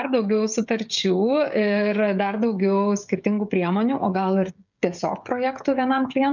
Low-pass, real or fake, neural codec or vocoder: 7.2 kHz; fake; codec, 16 kHz, 16 kbps, FreqCodec, smaller model